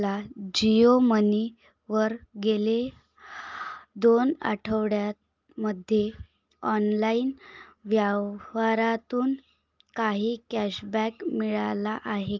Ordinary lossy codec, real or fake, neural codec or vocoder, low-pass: Opus, 32 kbps; real; none; 7.2 kHz